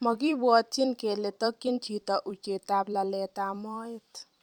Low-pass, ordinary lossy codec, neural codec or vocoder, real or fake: 19.8 kHz; none; vocoder, 44.1 kHz, 128 mel bands every 256 samples, BigVGAN v2; fake